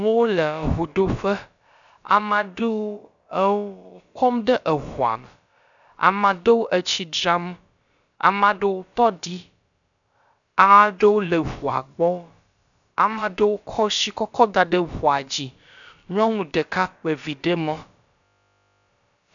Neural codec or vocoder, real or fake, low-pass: codec, 16 kHz, about 1 kbps, DyCAST, with the encoder's durations; fake; 7.2 kHz